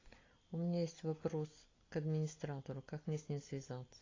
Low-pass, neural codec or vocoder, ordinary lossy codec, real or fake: 7.2 kHz; none; AAC, 32 kbps; real